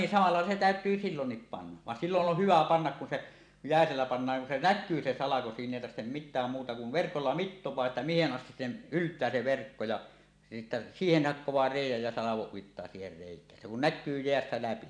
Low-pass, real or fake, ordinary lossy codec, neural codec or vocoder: 9.9 kHz; fake; none; vocoder, 44.1 kHz, 128 mel bands every 256 samples, BigVGAN v2